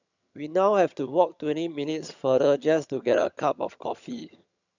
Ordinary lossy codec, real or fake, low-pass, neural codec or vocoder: none; fake; 7.2 kHz; vocoder, 22.05 kHz, 80 mel bands, HiFi-GAN